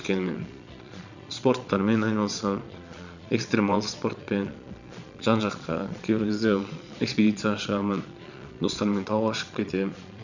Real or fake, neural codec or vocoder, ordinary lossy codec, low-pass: fake; vocoder, 22.05 kHz, 80 mel bands, Vocos; none; 7.2 kHz